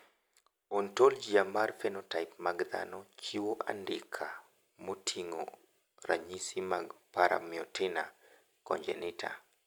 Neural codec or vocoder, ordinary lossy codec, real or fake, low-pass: none; none; real; none